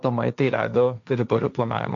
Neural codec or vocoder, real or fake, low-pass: codec, 16 kHz, 1.1 kbps, Voila-Tokenizer; fake; 7.2 kHz